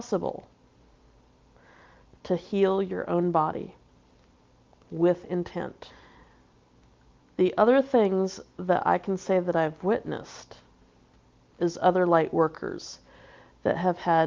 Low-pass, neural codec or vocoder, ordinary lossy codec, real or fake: 7.2 kHz; none; Opus, 24 kbps; real